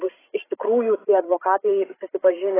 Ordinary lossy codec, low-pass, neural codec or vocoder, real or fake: AAC, 16 kbps; 3.6 kHz; codec, 16 kHz, 16 kbps, FreqCodec, larger model; fake